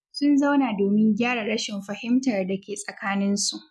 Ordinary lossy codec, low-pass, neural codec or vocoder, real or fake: none; none; none; real